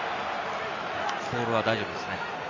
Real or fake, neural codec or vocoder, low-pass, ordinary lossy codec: real; none; 7.2 kHz; MP3, 64 kbps